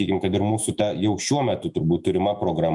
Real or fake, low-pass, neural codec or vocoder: fake; 10.8 kHz; autoencoder, 48 kHz, 128 numbers a frame, DAC-VAE, trained on Japanese speech